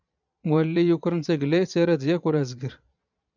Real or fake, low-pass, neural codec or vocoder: fake; 7.2 kHz; vocoder, 22.05 kHz, 80 mel bands, Vocos